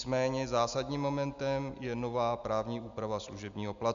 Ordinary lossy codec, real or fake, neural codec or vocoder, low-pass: MP3, 64 kbps; real; none; 7.2 kHz